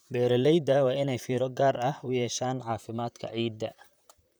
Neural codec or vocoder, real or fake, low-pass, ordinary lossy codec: vocoder, 44.1 kHz, 128 mel bands, Pupu-Vocoder; fake; none; none